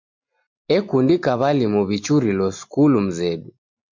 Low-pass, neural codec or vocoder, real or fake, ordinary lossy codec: 7.2 kHz; none; real; MP3, 48 kbps